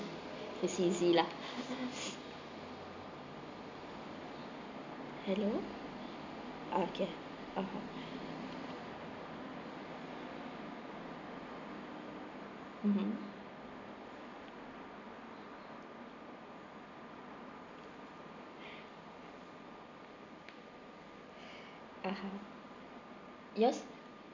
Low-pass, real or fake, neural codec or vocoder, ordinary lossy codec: 7.2 kHz; real; none; MP3, 64 kbps